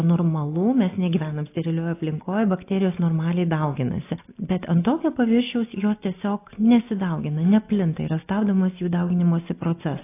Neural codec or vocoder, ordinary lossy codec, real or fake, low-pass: none; AAC, 24 kbps; real; 3.6 kHz